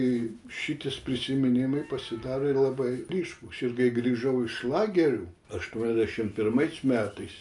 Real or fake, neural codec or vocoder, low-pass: real; none; 10.8 kHz